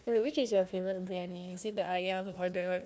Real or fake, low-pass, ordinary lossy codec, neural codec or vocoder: fake; none; none; codec, 16 kHz, 1 kbps, FunCodec, trained on Chinese and English, 50 frames a second